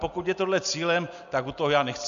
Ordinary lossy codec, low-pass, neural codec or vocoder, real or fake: MP3, 96 kbps; 7.2 kHz; none; real